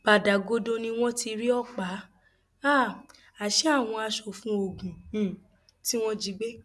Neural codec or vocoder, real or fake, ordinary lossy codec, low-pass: none; real; none; none